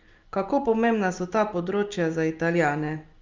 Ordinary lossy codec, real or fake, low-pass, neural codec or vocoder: Opus, 32 kbps; real; 7.2 kHz; none